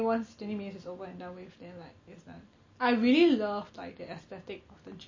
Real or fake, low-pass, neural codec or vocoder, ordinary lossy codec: real; 7.2 kHz; none; MP3, 32 kbps